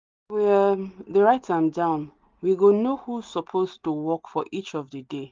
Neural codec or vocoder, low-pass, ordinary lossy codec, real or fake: none; 7.2 kHz; Opus, 32 kbps; real